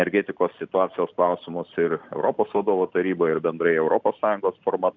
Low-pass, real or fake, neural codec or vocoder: 7.2 kHz; real; none